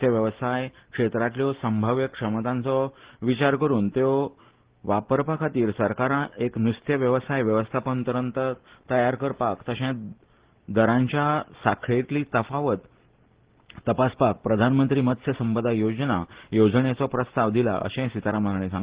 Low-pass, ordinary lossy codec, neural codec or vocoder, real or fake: 3.6 kHz; Opus, 16 kbps; none; real